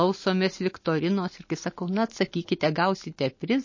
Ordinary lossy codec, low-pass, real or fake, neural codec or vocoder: MP3, 32 kbps; 7.2 kHz; real; none